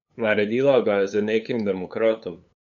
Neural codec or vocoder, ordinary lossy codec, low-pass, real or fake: codec, 16 kHz, 8 kbps, FunCodec, trained on LibriTTS, 25 frames a second; none; 7.2 kHz; fake